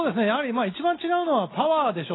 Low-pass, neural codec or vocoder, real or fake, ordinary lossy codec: 7.2 kHz; none; real; AAC, 16 kbps